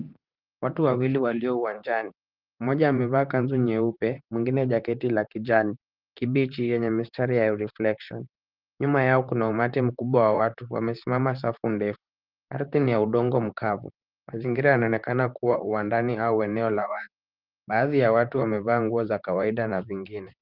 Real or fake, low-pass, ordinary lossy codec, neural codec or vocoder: fake; 5.4 kHz; Opus, 24 kbps; vocoder, 44.1 kHz, 128 mel bands, Pupu-Vocoder